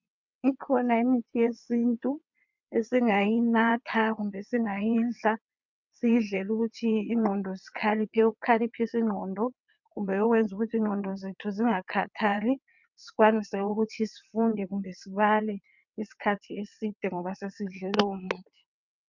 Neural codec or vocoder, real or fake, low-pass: vocoder, 22.05 kHz, 80 mel bands, WaveNeXt; fake; 7.2 kHz